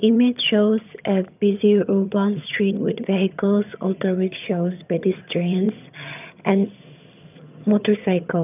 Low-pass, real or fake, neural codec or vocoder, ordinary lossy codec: 3.6 kHz; fake; vocoder, 22.05 kHz, 80 mel bands, HiFi-GAN; none